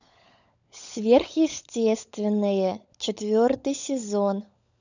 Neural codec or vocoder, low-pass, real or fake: codec, 16 kHz, 16 kbps, FunCodec, trained on LibriTTS, 50 frames a second; 7.2 kHz; fake